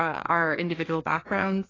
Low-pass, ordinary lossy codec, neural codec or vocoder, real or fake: 7.2 kHz; AAC, 32 kbps; codec, 16 kHz, 2 kbps, FreqCodec, larger model; fake